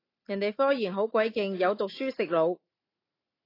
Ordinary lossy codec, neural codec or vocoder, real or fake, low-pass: AAC, 24 kbps; none; real; 5.4 kHz